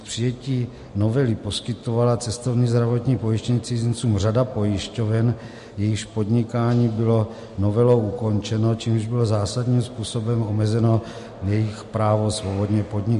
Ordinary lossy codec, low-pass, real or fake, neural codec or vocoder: MP3, 48 kbps; 14.4 kHz; real; none